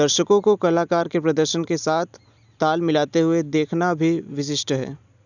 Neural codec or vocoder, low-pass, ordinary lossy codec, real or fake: none; 7.2 kHz; none; real